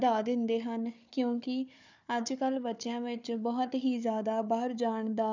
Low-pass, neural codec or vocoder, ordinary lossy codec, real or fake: 7.2 kHz; codec, 16 kHz, 4 kbps, FunCodec, trained on Chinese and English, 50 frames a second; none; fake